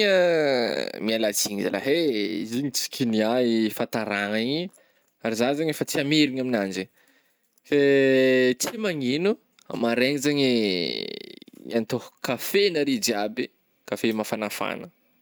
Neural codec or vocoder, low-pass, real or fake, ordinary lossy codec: none; none; real; none